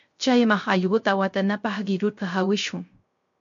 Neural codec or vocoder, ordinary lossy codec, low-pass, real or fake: codec, 16 kHz, 0.3 kbps, FocalCodec; MP3, 48 kbps; 7.2 kHz; fake